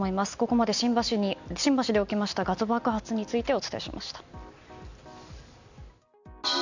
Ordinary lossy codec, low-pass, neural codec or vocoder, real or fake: none; 7.2 kHz; none; real